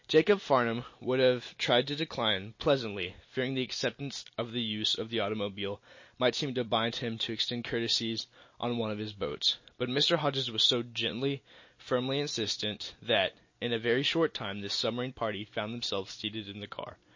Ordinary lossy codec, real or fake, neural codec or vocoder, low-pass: MP3, 32 kbps; real; none; 7.2 kHz